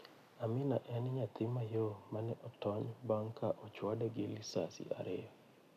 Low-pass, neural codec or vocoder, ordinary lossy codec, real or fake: 14.4 kHz; none; none; real